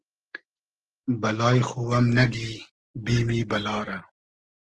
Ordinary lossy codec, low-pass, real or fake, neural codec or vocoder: Opus, 16 kbps; 10.8 kHz; real; none